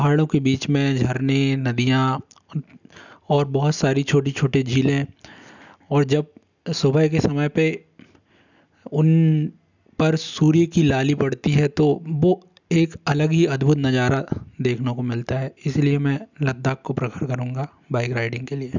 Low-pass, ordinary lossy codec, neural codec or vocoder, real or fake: 7.2 kHz; none; none; real